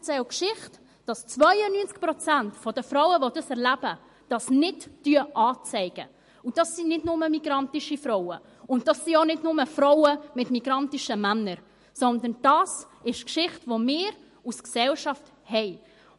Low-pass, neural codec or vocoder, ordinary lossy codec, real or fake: 14.4 kHz; none; MP3, 48 kbps; real